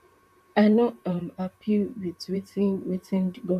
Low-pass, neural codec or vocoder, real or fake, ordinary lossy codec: 14.4 kHz; vocoder, 44.1 kHz, 128 mel bands, Pupu-Vocoder; fake; MP3, 96 kbps